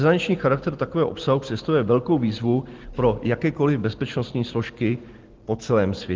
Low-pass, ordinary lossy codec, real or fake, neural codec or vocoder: 7.2 kHz; Opus, 16 kbps; real; none